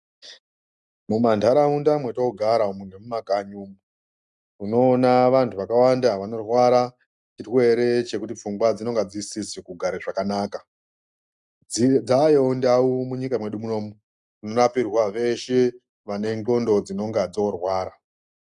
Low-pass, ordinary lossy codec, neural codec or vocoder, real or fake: 10.8 kHz; Opus, 64 kbps; none; real